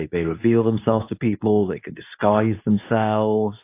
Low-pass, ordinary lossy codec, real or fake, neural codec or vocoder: 3.6 kHz; AAC, 24 kbps; fake; codec, 24 kHz, 0.9 kbps, WavTokenizer, medium speech release version 2